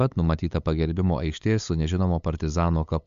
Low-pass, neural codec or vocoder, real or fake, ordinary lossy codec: 7.2 kHz; codec, 16 kHz, 8 kbps, FunCodec, trained on LibriTTS, 25 frames a second; fake; MP3, 64 kbps